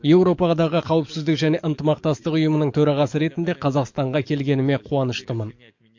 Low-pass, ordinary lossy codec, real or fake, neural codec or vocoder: 7.2 kHz; MP3, 48 kbps; fake; codec, 44.1 kHz, 7.8 kbps, DAC